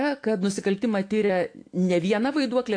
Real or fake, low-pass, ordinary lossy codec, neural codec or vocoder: fake; 9.9 kHz; AAC, 48 kbps; autoencoder, 48 kHz, 128 numbers a frame, DAC-VAE, trained on Japanese speech